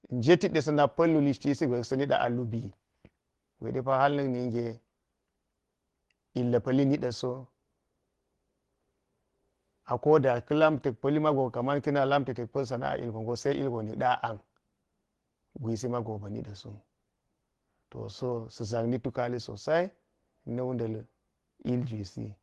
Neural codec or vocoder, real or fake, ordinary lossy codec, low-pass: none; real; Opus, 16 kbps; 10.8 kHz